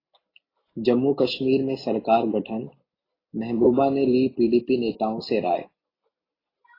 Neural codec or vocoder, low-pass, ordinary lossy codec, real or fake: none; 5.4 kHz; AAC, 24 kbps; real